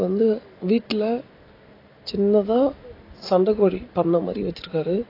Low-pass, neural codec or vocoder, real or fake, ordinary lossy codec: 5.4 kHz; none; real; AAC, 24 kbps